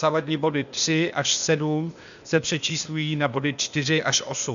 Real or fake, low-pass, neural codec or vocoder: fake; 7.2 kHz; codec, 16 kHz, 0.8 kbps, ZipCodec